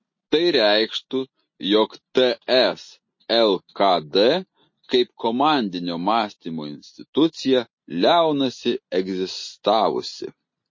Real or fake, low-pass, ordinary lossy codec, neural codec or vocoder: real; 7.2 kHz; MP3, 32 kbps; none